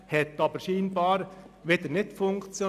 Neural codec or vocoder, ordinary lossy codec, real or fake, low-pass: none; none; real; 14.4 kHz